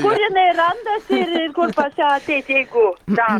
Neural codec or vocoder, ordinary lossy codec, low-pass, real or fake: none; Opus, 24 kbps; 19.8 kHz; real